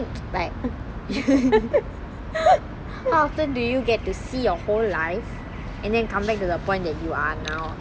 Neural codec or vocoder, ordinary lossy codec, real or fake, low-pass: none; none; real; none